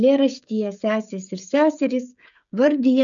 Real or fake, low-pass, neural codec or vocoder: fake; 7.2 kHz; codec, 16 kHz, 16 kbps, FreqCodec, smaller model